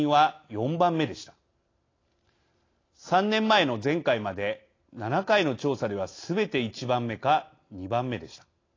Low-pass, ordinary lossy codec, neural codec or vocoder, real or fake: 7.2 kHz; AAC, 32 kbps; none; real